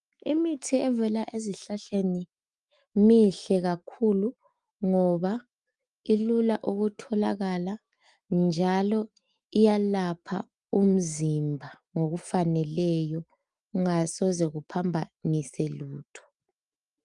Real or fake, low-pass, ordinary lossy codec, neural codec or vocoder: fake; 10.8 kHz; Opus, 32 kbps; autoencoder, 48 kHz, 128 numbers a frame, DAC-VAE, trained on Japanese speech